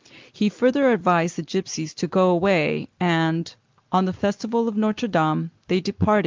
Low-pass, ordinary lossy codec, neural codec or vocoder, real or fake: 7.2 kHz; Opus, 32 kbps; none; real